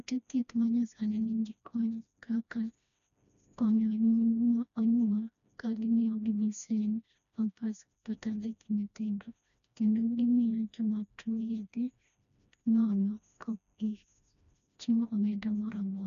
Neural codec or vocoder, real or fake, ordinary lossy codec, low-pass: codec, 16 kHz, 1 kbps, FreqCodec, smaller model; fake; MP3, 96 kbps; 7.2 kHz